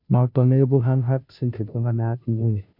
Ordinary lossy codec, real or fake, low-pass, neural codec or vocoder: none; fake; 5.4 kHz; codec, 16 kHz, 0.5 kbps, FunCodec, trained on Chinese and English, 25 frames a second